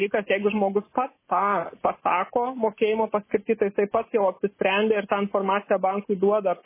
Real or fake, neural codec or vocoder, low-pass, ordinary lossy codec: real; none; 3.6 kHz; MP3, 16 kbps